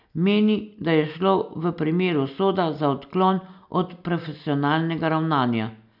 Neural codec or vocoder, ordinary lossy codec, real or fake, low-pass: none; none; real; 5.4 kHz